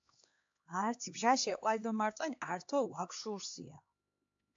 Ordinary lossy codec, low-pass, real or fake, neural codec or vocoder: AAC, 48 kbps; 7.2 kHz; fake; codec, 16 kHz, 2 kbps, X-Codec, HuBERT features, trained on LibriSpeech